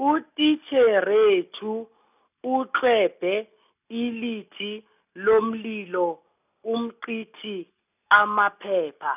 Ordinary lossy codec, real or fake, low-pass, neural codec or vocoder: none; real; 3.6 kHz; none